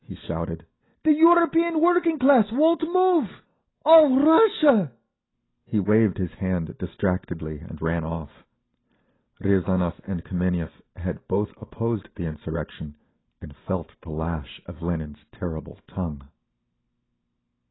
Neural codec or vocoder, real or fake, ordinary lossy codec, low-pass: none; real; AAC, 16 kbps; 7.2 kHz